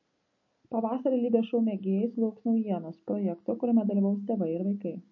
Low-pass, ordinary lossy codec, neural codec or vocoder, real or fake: 7.2 kHz; MP3, 32 kbps; none; real